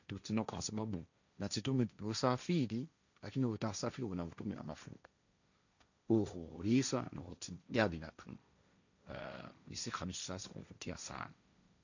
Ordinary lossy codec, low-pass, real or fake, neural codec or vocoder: none; 7.2 kHz; fake; codec, 16 kHz, 1.1 kbps, Voila-Tokenizer